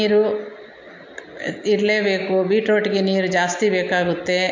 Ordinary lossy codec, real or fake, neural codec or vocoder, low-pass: MP3, 48 kbps; real; none; 7.2 kHz